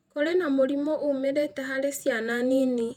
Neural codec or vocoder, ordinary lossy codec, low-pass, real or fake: vocoder, 48 kHz, 128 mel bands, Vocos; none; 19.8 kHz; fake